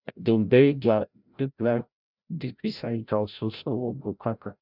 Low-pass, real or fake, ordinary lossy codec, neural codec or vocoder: 5.4 kHz; fake; none; codec, 16 kHz, 0.5 kbps, FreqCodec, larger model